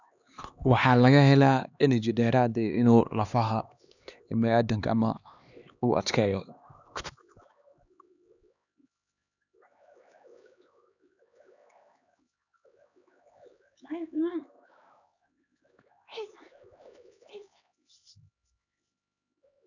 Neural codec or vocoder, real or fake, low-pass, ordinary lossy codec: codec, 16 kHz, 2 kbps, X-Codec, HuBERT features, trained on LibriSpeech; fake; 7.2 kHz; none